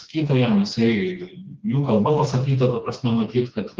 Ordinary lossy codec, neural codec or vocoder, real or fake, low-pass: Opus, 24 kbps; codec, 16 kHz, 2 kbps, FreqCodec, smaller model; fake; 7.2 kHz